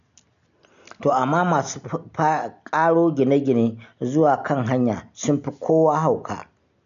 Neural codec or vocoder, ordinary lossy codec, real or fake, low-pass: none; none; real; 7.2 kHz